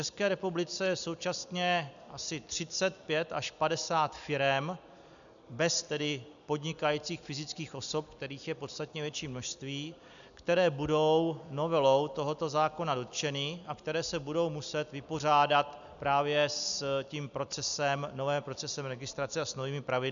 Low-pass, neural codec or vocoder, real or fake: 7.2 kHz; none; real